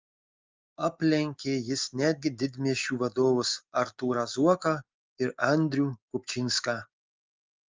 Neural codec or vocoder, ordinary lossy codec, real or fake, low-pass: none; Opus, 24 kbps; real; 7.2 kHz